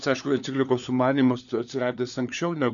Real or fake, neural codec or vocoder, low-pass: fake; codec, 16 kHz, 4 kbps, FunCodec, trained on LibriTTS, 50 frames a second; 7.2 kHz